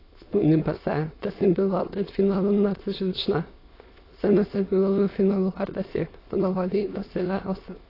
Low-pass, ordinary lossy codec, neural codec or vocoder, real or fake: 5.4 kHz; AAC, 24 kbps; autoencoder, 22.05 kHz, a latent of 192 numbers a frame, VITS, trained on many speakers; fake